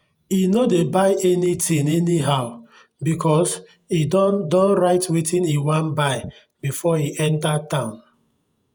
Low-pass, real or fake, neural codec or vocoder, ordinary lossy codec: none; fake; vocoder, 48 kHz, 128 mel bands, Vocos; none